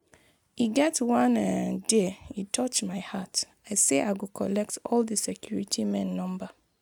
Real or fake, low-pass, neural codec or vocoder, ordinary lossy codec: real; 19.8 kHz; none; none